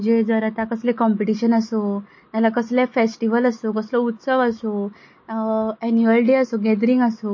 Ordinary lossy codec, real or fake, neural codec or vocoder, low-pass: MP3, 32 kbps; real; none; 7.2 kHz